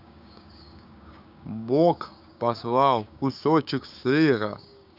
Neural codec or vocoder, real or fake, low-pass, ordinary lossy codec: codec, 16 kHz, 6 kbps, DAC; fake; 5.4 kHz; none